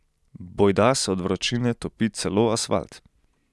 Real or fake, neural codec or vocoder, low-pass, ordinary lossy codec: real; none; none; none